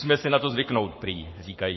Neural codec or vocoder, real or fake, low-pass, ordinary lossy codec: codec, 44.1 kHz, 7.8 kbps, Pupu-Codec; fake; 7.2 kHz; MP3, 24 kbps